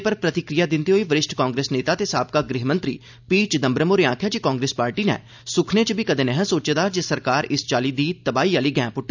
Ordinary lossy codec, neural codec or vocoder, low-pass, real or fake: none; none; 7.2 kHz; real